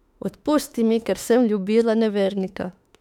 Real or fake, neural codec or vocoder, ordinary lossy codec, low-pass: fake; autoencoder, 48 kHz, 32 numbers a frame, DAC-VAE, trained on Japanese speech; none; 19.8 kHz